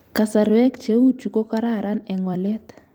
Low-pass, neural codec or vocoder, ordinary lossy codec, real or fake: 19.8 kHz; vocoder, 44.1 kHz, 128 mel bands every 256 samples, BigVGAN v2; Opus, 32 kbps; fake